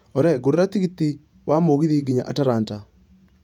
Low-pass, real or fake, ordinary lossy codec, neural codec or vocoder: 19.8 kHz; fake; none; vocoder, 48 kHz, 128 mel bands, Vocos